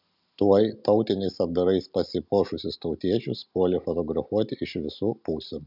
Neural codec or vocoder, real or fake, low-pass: none; real; 5.4 kHz